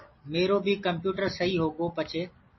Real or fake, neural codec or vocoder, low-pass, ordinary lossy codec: real; none; 7.2 kHz; MP3, 24 kbps